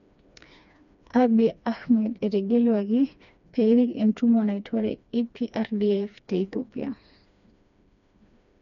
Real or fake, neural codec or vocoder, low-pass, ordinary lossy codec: fake; codec, 16 kHz, 2 kbps, FreqCodec, smaller model; 7.2 kHz; none